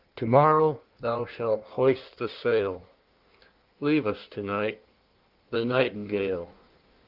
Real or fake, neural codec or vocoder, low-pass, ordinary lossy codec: fake; codec, 16 kHz in and 24 kHz out, 1.1 kbps, FireRedTTS-2 codec; 5.4 kHz; Opus, 32 kbps